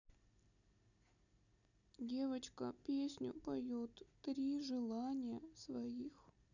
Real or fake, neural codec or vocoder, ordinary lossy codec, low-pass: real; none; none; 7.2 kHz